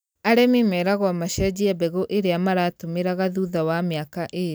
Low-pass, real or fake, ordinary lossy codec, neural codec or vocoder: none; real; none; none